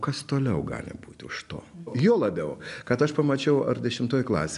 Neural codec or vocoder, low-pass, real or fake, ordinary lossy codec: none; 10.8 kHz; real; AAC, 96 kbps